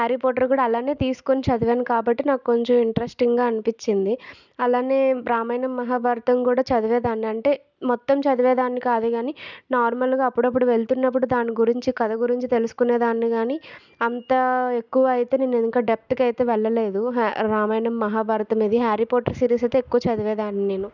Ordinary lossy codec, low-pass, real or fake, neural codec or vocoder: none; 7.2 kHz; real; none